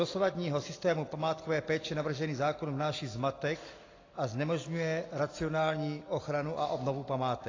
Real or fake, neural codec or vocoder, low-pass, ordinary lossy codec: real; none; 7.2 kHz; AAC, 32 kbps